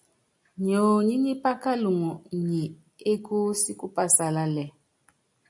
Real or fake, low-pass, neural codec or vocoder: real; 10.8 kHz; none